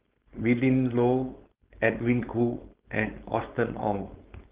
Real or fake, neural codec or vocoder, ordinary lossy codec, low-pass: fake; codec, 16 kHz, 4.8 kbps, FACodec; Opus, 16 kbps; 3.6 kHz